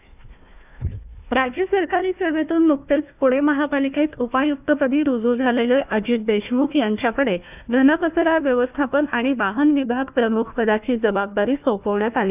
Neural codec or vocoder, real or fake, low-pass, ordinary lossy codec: codec, 16 kHz, 1 kbps, FunCodec, trained on Chinese and English, 50 frames a second; fake; 3.6 kHz; none